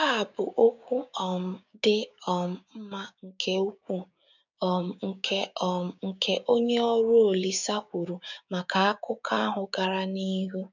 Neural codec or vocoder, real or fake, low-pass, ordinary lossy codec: codec, 16 kHz, 6 kbps, DAC; fake; 7.2 kHz; none